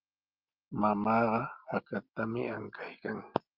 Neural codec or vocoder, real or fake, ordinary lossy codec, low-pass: none; real; Opus, 24 kbps; 5.4 kHz